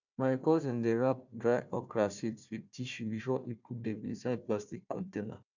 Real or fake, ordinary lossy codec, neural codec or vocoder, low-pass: fake; none; codec, 16 kHz, 1 kbps, FunCodec, trained on Chinese and English, 50 frames a second; 7.2 kHz